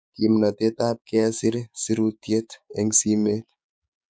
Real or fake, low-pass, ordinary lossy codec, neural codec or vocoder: fake; none; none; codec, 16 kHz, 6 kbps, DAC